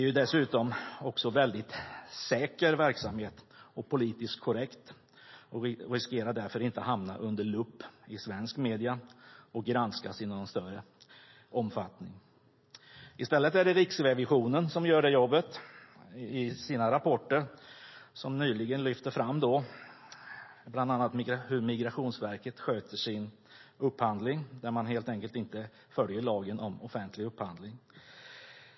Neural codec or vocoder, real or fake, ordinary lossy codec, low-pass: none; real; MP3, 24 kbps; 7.2 kHz